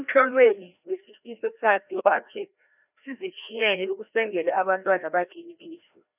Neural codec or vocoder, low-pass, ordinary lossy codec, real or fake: codec, 16 kHz, 1 kbps, FreqCodec, larger model; 3.6 kHz; none; fake